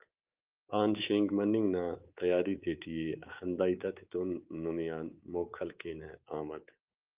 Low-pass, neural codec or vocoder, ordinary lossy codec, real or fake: 3.6 kHz; codec, 24 kHz, 3.1 kbps, DualCodec; Opus, 32 kbps; fake